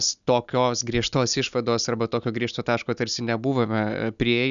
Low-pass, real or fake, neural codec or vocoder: 7.2 kHz; real; none